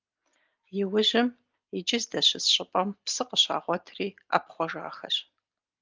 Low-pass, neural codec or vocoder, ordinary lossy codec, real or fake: 7.2 kHz; none; Opus, 32 kbps; real